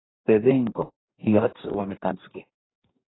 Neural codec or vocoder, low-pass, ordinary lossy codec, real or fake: codec, 44.1 kHz, 2.6 kbps, DAC; 7.2 kHz; AAC, 16 kbps; fake